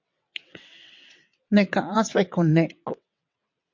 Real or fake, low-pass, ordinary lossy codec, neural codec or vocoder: fake; 7.2 kHz; MP3, 48 kbps; vocoder, 22.05 kHz, 80 mel bands, WaveNeXt